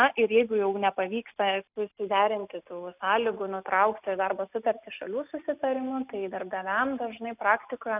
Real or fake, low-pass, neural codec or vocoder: real; 3.6 kHz; none